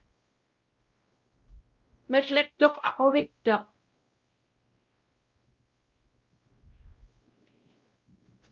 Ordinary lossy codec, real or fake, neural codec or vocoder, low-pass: Opus, 32 kbps; fake; codec, 16 kHz, 0.5 kbps, X-Codec, WavLM features, trained on Multilingual LibriSpeech; 7.2 kHz